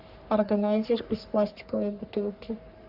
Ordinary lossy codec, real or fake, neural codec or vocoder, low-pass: Opus, 64 kbps; fake; codec, 44.1 kHz, 3.4 kbps, Pupu-Codec; 5.4 kHz